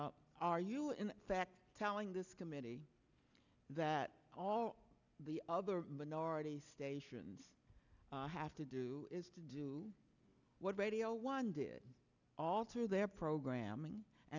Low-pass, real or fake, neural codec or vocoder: 7.2 kHz; real; none